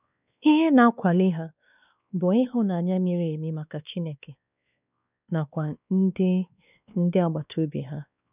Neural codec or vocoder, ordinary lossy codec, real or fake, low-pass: codec, 16 kHz, 2 kbps, X-Codec, WavLM features, trained on Multilingual LibriSpeech; none; fake; 3.6 kHz